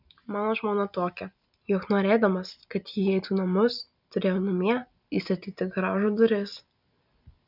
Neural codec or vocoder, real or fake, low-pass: none; real; 5.4 kHz